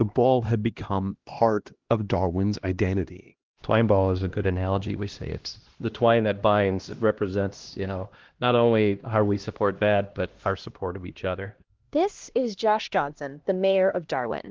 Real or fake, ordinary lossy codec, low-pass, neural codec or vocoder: fake; Opus, 16 kbps; 7.2 kHz; codec, 16 kHz, 1 kbps, X-Codec, HuBERT features, trained on LibriSpeech